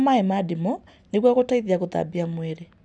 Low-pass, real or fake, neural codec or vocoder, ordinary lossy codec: none; real; none; none